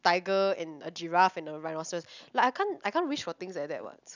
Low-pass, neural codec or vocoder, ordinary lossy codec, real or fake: 7.2 kHz; none; none; real